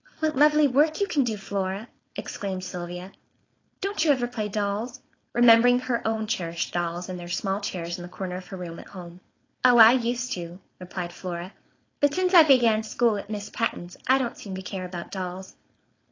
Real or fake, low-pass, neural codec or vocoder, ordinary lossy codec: fake; 7.2 kHz; codec, 16 kHz, 4.8 kbps, FACodec; AAC, 32 kbps